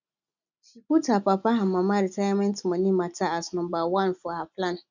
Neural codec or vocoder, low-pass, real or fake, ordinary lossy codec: none; 7.2 kHz; real; none